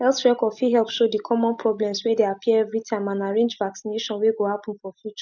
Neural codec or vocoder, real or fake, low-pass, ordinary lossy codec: none; real; 7.2 kHz; none